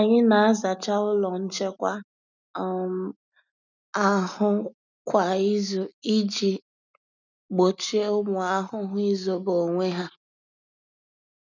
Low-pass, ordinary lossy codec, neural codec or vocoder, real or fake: 7.2 kHz; none; none; real